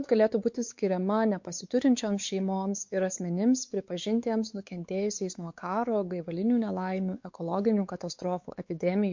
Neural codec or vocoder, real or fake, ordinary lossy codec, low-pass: codec, 16 kHz, 4 kbps, X-Codec, WavLM features, trained on Multilingual LibriSpeech; fake; MP3, 48 kbps; 7.2 kHz